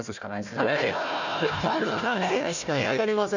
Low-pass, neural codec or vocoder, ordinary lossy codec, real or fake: 7.2 kHz; codec, 16 kHz, 1 kbps, FunCodec, trained on Chinese and English, 50 frames a second; none; fake